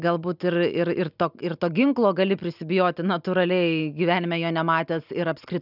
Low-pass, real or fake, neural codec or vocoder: 5.4 kHz; real; none